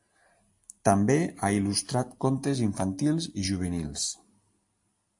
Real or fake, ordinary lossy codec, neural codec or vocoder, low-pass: real; AAC, 64 kbps; none; 10.8 kHz